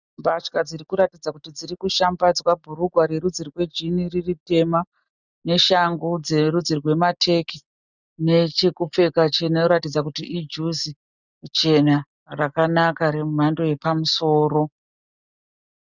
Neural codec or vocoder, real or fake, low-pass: none; real; 7.2 kHz